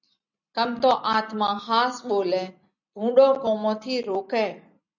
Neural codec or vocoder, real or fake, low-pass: none; real; 7.2 kHz